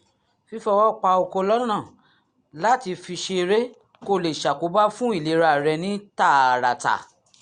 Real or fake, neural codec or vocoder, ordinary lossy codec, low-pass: real; none; Opus, 64 kbps; 9.9 kHz